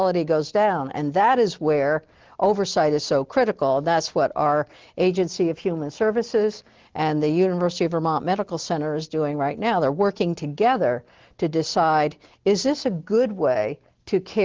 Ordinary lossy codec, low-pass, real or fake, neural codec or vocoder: Opus, 16 kbps; 7.2 kHz; real; none